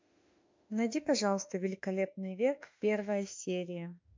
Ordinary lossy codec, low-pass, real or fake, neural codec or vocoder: MP3, 64 kbps; 7.2 kHz; fake; autoencoder, 48 kHz, 32 numbers a frame, DAC-VAE, trained on Japanese speech